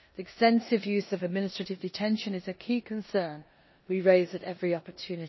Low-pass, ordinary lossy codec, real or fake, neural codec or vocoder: 7.2 kHz; MP3, 24 kbps; fake; codec, 16 kHz in and 24 kHz out, 0.9 kbps, LongCat-Audio-Codec, four codebook decoder